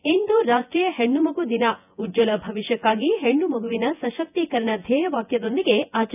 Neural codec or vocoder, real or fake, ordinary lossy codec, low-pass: vocoder, 24 kHz, 100 mel bands, Vocos; fake; none; 3.6 kHz